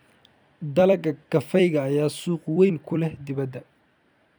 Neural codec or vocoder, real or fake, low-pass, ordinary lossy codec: vocoder, 44.1 kHz, 128 mel bands every 256 samples, BigVGAN v2; fake; none; none